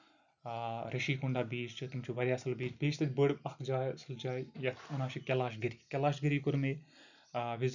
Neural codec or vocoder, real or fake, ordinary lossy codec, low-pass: none; real; none; 7.2 kHz